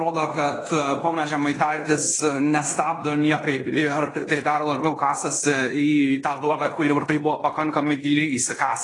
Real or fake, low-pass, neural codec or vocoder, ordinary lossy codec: fake; 10.8 kHz; codec, 16 kHz in and 24 kHz out, 0.9 kbps, LongCat-Audio-Codec, fine tuned four codebook decoder; AAC, 32 kbps